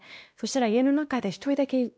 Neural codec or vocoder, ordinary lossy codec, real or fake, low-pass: codec, 16 kHz, 1 kbps, X-Codec, WavLM features, trained on Multilingual LibriSpeech; none; fake; none